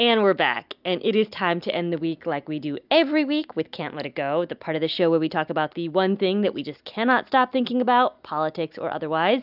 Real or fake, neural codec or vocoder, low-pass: real; none; 5.4 kHz